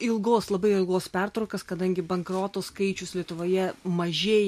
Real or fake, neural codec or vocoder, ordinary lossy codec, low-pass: real; none; MP3, 64 kbps; 14.4 kHz